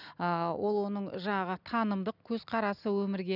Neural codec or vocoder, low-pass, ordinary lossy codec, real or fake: none; 5.4 kHz; none; real